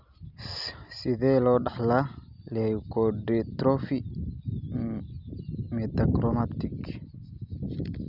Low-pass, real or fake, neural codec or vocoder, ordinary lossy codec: 5.4 kHz; real; none; none